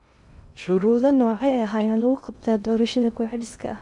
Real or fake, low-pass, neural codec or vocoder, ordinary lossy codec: fake; 10.8 kHz; codec, 16 kHz in and 24 kHz out, 0.6 kbps, FocalCodec, streaming, 2048 codes; MP3, 96 kbps